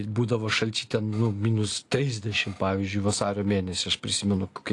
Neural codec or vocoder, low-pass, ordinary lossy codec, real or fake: none; 10.8 kHz; AAC, 48 kbps; real